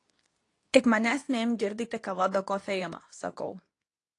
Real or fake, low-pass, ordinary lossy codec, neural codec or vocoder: fake; 10.8 kHz; AAC, 48 kbps; codec, 24 kHz, 0.9 kbps, WavTokenizer, medium speech release version 2